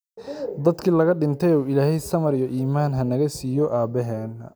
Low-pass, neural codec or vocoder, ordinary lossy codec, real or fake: none; none; none; real